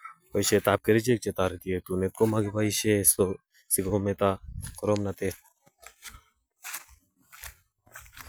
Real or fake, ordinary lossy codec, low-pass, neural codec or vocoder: real; none; none; none